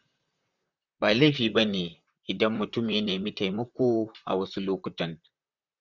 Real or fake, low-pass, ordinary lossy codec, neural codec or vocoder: fake; 7.2 kHz; Opus, 64 kbps; vocoder, 44.1 kHz, 128 mel bands, Pupu-Vocoder